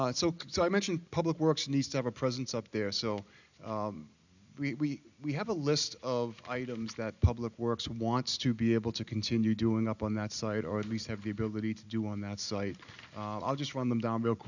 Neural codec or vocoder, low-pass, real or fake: none; 7.2 kHz; real